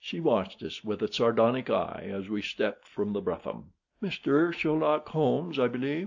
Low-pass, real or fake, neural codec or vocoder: 7.2 kHz; real; none